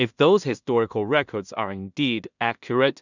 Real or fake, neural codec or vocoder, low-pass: fake; codec, 16 kHz in and 24 kHz out, 0.4 kbps, LongCat-Audio-Codec, two codebook decoder; 7.2 kHz